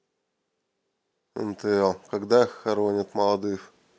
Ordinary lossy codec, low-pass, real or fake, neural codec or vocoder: none; none; real; none